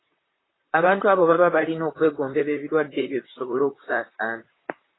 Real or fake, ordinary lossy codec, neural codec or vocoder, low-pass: fake; AAC, 16 kbps; vocoder, 44.1 kHz, 128 mel bands, Pupu-Vocoder; 7.2 kHz